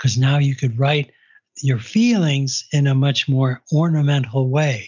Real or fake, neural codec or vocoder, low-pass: real; none; 7.2 kHz